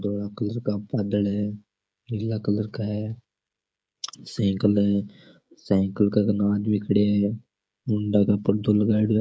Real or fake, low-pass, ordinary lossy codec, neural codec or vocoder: fake; none; none; codec, 16 kHz, 16 kbps, FreqCodec, smaller model